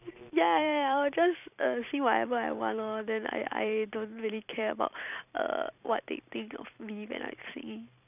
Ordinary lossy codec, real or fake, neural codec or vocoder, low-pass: none; fake; vocoder, 44.1 kHz, 128 mel bands every 256 samples, BigVGAN v2; 3.6 kHz